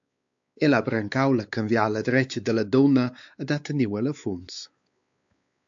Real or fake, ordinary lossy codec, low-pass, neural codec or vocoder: fake; AAC, 64 kbps; 7.2 kHz; codec, 16 kHz, 4 kbps, X-Codec, WavLM features, trained on Multilingual LibriSpeech